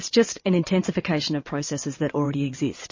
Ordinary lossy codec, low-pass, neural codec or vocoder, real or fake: MP3, 32 kbps; 7.2 kHz; vocoder, 22.05 kHz, 80 mel bands, WaveNeXt; fake